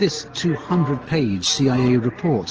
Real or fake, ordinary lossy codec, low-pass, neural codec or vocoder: real; Opus, 16 kbps; 7.2 kHz; none